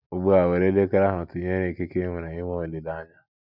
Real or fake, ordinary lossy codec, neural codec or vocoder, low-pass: real; none; none; 5.4 kHz